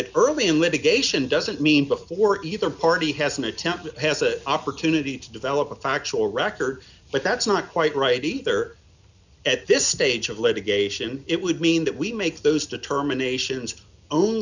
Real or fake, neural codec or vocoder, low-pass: real; none; 7.2 kHz